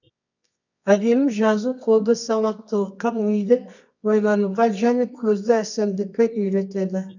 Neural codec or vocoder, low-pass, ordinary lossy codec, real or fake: codec, 24 kHz, 0.9 kbps, WavTokenizer, medium music audio release; 7.2 kHz; none; fake